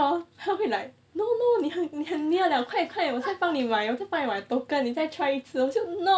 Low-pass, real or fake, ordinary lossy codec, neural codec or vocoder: none; real; none; none